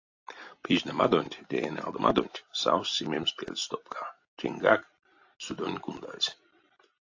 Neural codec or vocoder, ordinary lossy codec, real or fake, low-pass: none; AAC, 48 kbps; real; 7.2 kHz